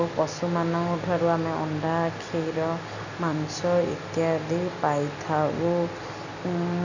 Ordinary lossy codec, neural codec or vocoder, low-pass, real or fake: none; none; 7.2 kHz; real